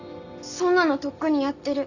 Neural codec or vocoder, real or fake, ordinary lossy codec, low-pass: none; real; none; 7.2 kHz